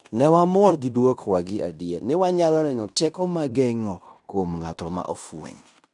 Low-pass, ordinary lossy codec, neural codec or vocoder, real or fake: 10.8 kHz; none; codec, 16 kHz in and 24 kHz out, 0.9 kbps, LongCat-Audio-Codec, fine tuned four codebook decoder; fake